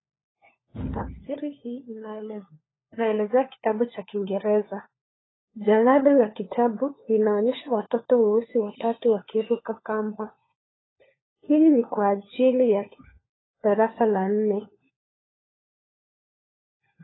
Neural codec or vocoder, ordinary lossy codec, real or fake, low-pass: codec, 16 kHz, 4 kbps, FunCodec, trained on LibriTTS, 50 frames a second; AAC, 16 kbps; fake; 7.2 kHz